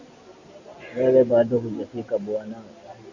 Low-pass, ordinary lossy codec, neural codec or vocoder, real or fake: 7.2 kHz; Opus, 64 kbps; none; real